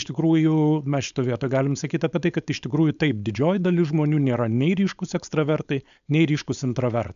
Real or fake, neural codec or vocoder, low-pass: fake; codec, 16 kHz, 4.8 kbps, FACodec; 7.2 kHz